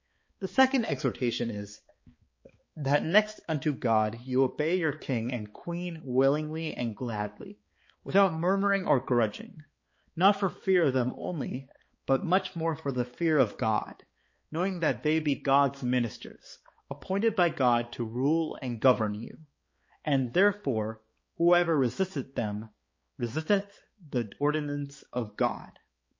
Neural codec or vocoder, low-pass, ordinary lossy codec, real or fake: codec, 16 kHz, 4 kbps, X-Codec, HuBERT features, trained on balanced general audio; 7.2 kHz; MP3, 32 kbps; fake